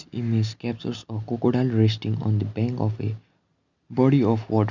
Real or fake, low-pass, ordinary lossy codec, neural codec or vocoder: real; 7.2 kHz; none; none